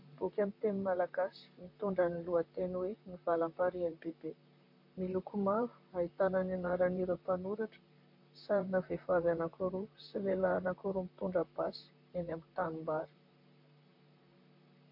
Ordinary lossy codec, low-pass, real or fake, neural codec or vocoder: MP3, 32 kbps; 5.4 kHz; fake; vocoder, 44.1 kHz, 128 mel bands, Pupu-Vocoder